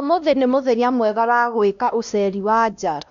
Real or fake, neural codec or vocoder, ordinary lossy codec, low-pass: fake; codec, 16 kHz, 1 kbps, X-Codec, WavLM features, trained on Multilingual LibriSpeech; none; 7.2 kHz